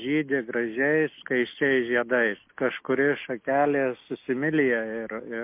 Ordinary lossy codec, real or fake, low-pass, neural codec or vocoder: MP3, 24 kbps; real; 3.6 kHz; none